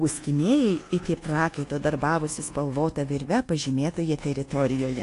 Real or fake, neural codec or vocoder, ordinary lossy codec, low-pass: fake; codec, 24 kHz, 1.2 kbps, DualCodec; AAC, 48 kbps; 10.8 kHz